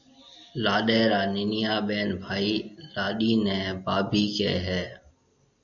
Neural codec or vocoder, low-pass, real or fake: none; 7.2 kHz; real